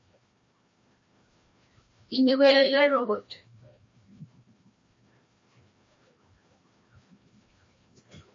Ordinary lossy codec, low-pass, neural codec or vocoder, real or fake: MP3, 32 kbps; 7.2 kHz; codec, 16 kHz, 1 kbps, FreqCodec, larger model; fake